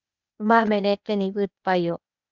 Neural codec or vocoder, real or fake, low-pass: codec, 16 kHz, 0.8 kbps, ZipCodec; fake; 7.2 kHz